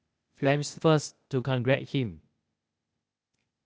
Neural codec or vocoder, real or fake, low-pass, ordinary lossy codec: codec, 16 kHz, 0.8 kbps, ZipCodec; fake; none; none